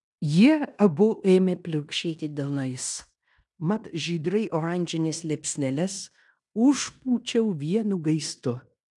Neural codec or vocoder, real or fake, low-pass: codec, 16 kHz in and 24 kHz out, 0.9 kbps, LongCat-Audio-Codec, fine tuned four codebook decoder; fake; 10.8 kHz